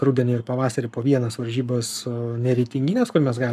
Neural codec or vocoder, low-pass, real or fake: codec, 44.1 kHz, 7.8 kbps, Pupu-Codec; 14.4 kHz; fake